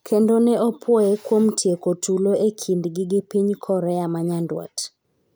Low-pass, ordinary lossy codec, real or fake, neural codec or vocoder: none; none; real; none